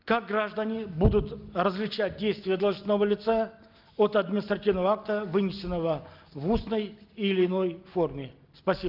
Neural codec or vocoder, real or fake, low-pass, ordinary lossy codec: none; real; 5.4 kHz; Opus, 32 kbps